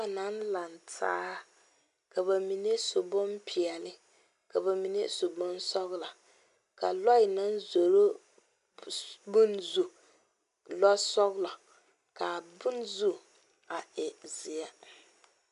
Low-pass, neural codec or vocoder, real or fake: 10.8 kHz; none; real